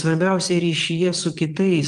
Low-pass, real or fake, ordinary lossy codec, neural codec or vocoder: 9.9 kHz; fake; Opus, 24 kbps; vocoder, 22.05 kHz, 80 mel bands, WaveNeXt